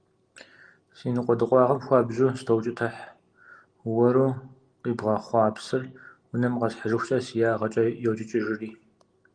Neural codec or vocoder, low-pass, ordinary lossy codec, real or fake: none; 9.9 kHz; Opus, 24 kbps; real